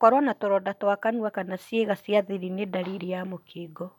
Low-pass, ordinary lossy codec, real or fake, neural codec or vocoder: 19.8 kHz; none; real; none